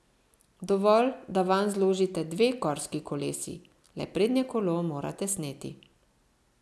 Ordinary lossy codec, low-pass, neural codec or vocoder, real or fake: none; none; none; real